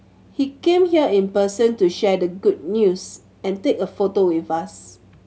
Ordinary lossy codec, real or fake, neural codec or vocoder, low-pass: none; real; none; none